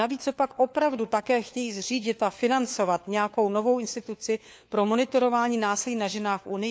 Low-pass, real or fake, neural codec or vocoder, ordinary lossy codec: none; fake; codec, 16 kHz, 4 kbps, FunCodec, trained on LibriTTS, 50 frames a second; none